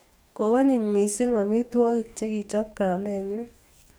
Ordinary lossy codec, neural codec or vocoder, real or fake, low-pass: none; codec, 44.1 kHz, 2.6 kbps, DAC; fake; none